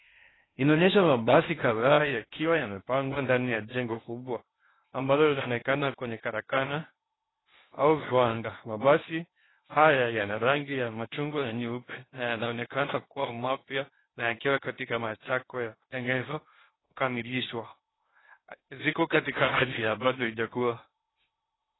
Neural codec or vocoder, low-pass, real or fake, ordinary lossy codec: codec, 16 kHz in and 24 kHz out, 0.6 kbps, FocalCodec, streaming, 4096 codes; 7.2 kHz; fake; AAC, 16 kbps